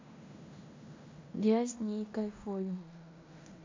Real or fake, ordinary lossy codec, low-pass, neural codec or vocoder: fake; MP3, 64 kbps; 7.2 kHz; codec, 16 kHz in and 24 kHz out, 0.9 kbps, LongCat-Audio-Codec, fine tuned four codebook decoder